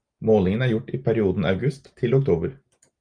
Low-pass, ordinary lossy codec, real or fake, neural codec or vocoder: 9.9 kHz; Opus, 32 kbps; real; none